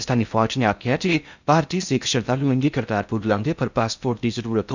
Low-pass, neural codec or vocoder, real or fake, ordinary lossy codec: 7.2 kHz; codec, 16 kHz in and 24 kHz out, 0.6 kbps, FocalCodec, streaming, 4096 codes; fake; none